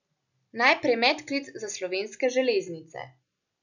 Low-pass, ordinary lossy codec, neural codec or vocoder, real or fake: 7.2 kHz; none; none; real